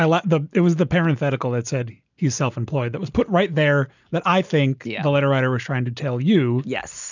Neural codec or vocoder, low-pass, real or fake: none; 7.2 kHz; real